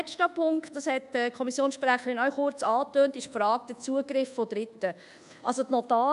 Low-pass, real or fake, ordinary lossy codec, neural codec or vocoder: 10.8 kHz; fake; none; codec, 24 kHz, 1.2 kbps, DualCodec